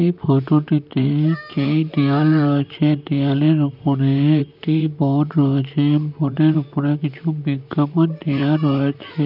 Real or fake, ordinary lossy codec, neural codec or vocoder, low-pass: fake; none; vocoder, 44.1 kHz, 128 mel bands, Pupu-Vocoder; 5.4 kHz